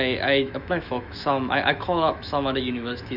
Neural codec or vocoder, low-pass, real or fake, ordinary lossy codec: autoencoder, 48 kHz, 128 numbers a frame, DAC-VAE, trained on Japanese speech; 5.4 kHz; fake; none